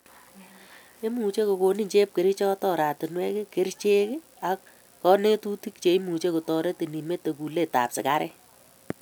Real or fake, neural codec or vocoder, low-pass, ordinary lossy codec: real; none; none; none